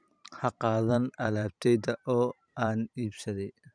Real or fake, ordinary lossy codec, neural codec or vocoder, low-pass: fake; none; vocoder, 44.1 kHz, 128 mel bands every 512 samples, BigVGAN v2; 9.9 kHz